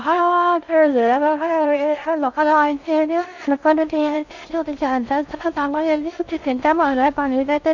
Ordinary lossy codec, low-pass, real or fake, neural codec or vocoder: none; 7.2 kHz; fake; codec, 16 kHz in and 24 kHz out, 0.6 kbps, FocalCodec, streaming, 4096 codes